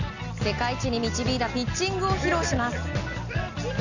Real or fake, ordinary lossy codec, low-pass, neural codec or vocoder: real; none; 7.2 kHz; none